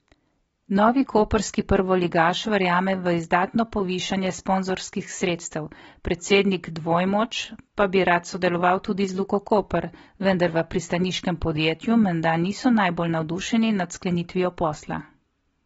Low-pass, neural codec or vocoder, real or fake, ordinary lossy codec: 19.8 kHz; none; real; AAC, 24 kbps